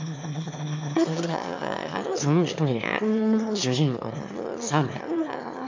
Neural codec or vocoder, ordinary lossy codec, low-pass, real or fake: autoencoder, 22.05 kHz, a latent of 192 numbers a frame, VITS, trained on one speaker; MP3, 48 kbps; 7.2 kHz; fake